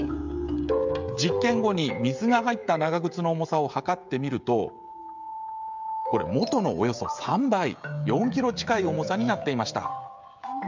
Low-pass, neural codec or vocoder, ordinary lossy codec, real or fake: 7.2 kHz; codec, 16 kHz, 16 kbps, FreqCodec, smaller model; MP3, 64 kbps; fake